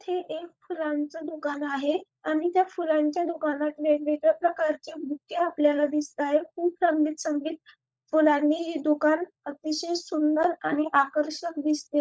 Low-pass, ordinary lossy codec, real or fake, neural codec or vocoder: none; none; fake; codec, 16 kHz, 16 kbps, FunCodec, trained on LibriTTS, 50 frames a second